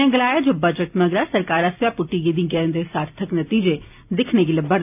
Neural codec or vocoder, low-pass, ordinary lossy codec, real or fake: none; 3.6 kHz; none; real